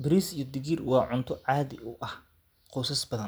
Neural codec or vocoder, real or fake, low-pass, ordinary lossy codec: none; real; none; none